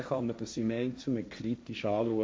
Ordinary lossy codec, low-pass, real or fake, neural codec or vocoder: none; none; fake; codec, 16 kHz, 1.1 kbps, Voila-Tokenizer